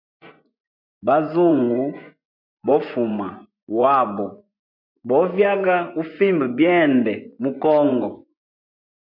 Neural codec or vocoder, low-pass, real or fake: vocoder, 24 kHz, 100 mel bands, Vocos; 5.4 kHz; fake